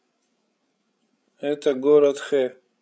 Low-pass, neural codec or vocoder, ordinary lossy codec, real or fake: none; codec, 16 kHz, 16 kbps, FreqCodec, larger model; none; fake